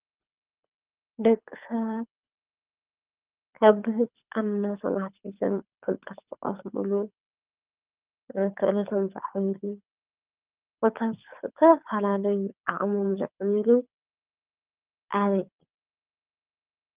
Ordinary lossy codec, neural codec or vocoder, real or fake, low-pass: Opus, 24 kbps; codec, 24 kHz, 6 kbps, HILCodec; fake; 3.6 kHz